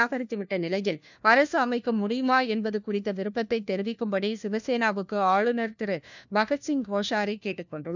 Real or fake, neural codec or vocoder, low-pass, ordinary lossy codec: fake; codec, 16 kHz, 1 kbps, FunCodec, trained on LibriTTS, 50 frames a second; 7.2 kHz; none